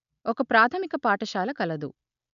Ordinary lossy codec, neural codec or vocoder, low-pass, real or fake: none; none; 7.2 kHz; real